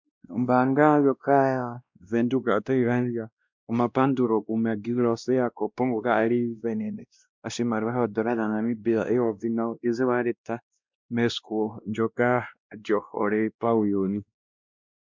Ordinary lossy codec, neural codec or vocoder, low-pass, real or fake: MP3, 64 kbps; codec, 16 kHz, 1 kbps, X-Codec, WavLM features, trained on Multilingual LibriSpeech; 7.2 kHz; fake